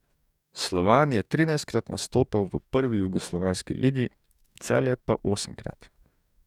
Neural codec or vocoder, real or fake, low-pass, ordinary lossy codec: codec, 44.1 kHz, 2.6 kbps, DAC; fake; 19.8 kHz; none